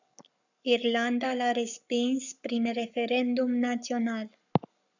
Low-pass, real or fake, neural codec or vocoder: 7.2 kHz; fake; codec, 44.1 kHz, 7.8 kbps, Pupu-Codec